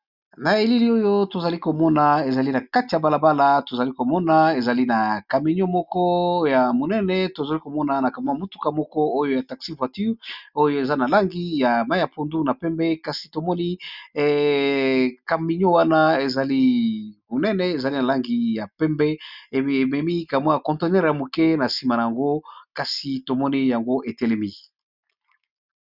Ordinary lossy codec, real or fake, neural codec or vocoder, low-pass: Opus, 64 kbps; real; none; 5.4 kHz